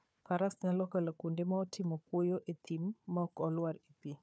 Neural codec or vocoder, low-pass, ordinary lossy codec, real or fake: codec, 16 kHz, 4 kbps, FunCodec, trained on Chinese and English, 50 frames a second; none; none; fake